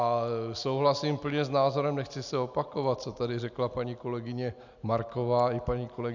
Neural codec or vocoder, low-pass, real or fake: none; 7.2 kHz; real